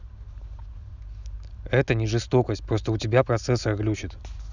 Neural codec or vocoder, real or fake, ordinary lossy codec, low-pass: none; real; none; 7.2 kHz